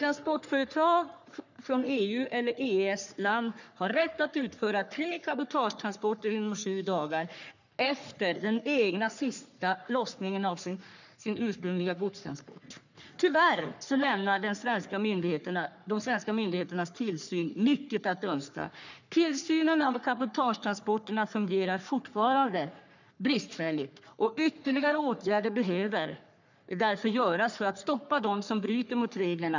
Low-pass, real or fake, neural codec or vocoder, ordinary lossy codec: 7.2 kHz; fake; codec, 44.1 kHz, 3.4 kbps, Pupu-Codec; none